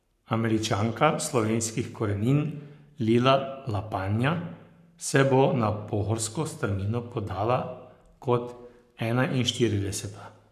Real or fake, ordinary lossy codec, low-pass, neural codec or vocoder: fake; none; 14.4 kHz; codec, 44.1 kHz, 7.8 kbps, Pupu-Codec